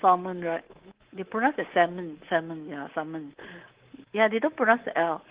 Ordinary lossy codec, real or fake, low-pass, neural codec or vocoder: Opus, 16 kbps; fake; 3.6 kHz; codec, 24 kHz, 3.1 kbps, DualCodec